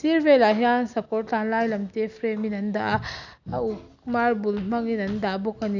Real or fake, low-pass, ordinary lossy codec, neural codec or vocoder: real; 7.2 kHz; none; none